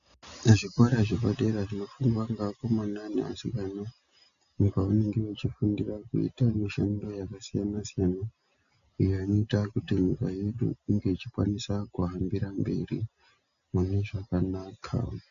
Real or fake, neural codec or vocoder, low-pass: real; none; 7.2 kHz